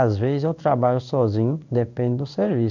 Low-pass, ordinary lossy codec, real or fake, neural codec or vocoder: 7.2 kHz; none; fake; codec, 16 kHz in and 24 kHz out, 1 kbps, XY-Tokenizer